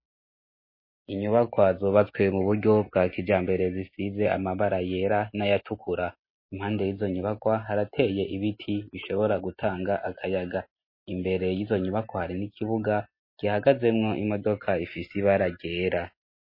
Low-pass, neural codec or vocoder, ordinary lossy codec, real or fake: 5.4 kHz; none; MP3, 24 kbps; real